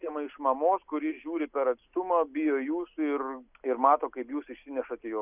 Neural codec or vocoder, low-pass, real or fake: none; 3.6 kHz; real